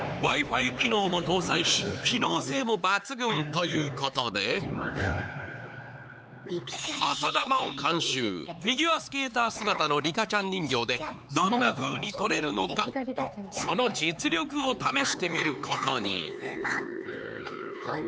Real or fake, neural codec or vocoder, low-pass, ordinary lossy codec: fake; codec, 16 kHz, 4 kbps, X-Codec, HuBERT features, trained on LibriSpeech; none; none